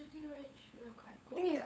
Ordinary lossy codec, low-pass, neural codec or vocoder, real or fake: none; none; codec, 16 kHz, 8 kbps, FunCodec, trained on LibriTTS, 25 frames a second; fake